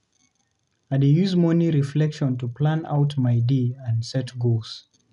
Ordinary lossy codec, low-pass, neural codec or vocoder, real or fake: none; 10.8 kHz; none; real